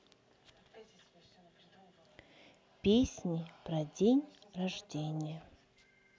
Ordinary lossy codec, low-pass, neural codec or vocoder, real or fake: none; none; none; real